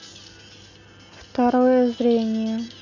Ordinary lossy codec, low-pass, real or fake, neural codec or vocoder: none; 7.2 kHz; real; none